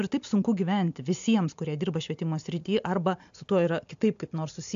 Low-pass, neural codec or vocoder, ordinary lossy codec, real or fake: 7.2 kHz; none; AAC, 64 kbps; real